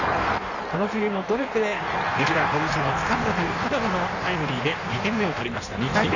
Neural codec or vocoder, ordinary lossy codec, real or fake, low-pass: codec, 16 kHz in and 24 kHz out, 1.1 kbps, FireRedTTS-2 codec; none; fake; 7.2 kHz